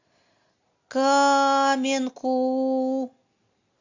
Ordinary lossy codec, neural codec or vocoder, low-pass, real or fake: AAC, 48 kbps; none; 7.2 kHz; real